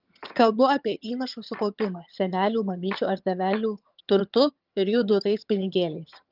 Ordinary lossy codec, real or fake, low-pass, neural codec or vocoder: Opus, 24 kbps; fake; 5.4 kHz; vocoder, 22.05 kHz, 80 mel bands, HiFi-GAN